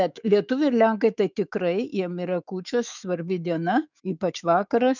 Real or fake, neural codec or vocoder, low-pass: fake; autoencoder, 48 kHz, 128 numbers a frame, DAC-VAE, trained on Japanese speech; 7.2 kHz